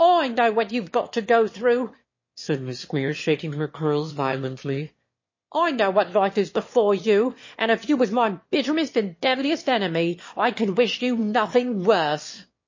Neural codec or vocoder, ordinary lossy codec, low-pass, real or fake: autoencoder, 22.05 kHz, a latent of 192 numbers a frame, VITS, trained on one speaker; MP3, 32 kbps; 7.2 kHz; fake